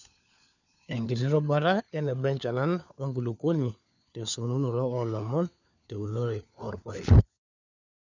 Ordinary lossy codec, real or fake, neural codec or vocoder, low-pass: none; fake; codec, 16 kHz, 4 kbps, FunCodec, trained on LibriTTS, 50 frames a second; 7.2 kHz